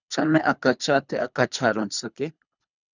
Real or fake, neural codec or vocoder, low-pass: fake; codec, 24 kHz, 3 kbps, HILCodec; 7.2 kHz